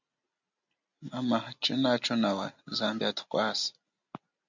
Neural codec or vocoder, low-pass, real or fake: none; 7.2 kHz; real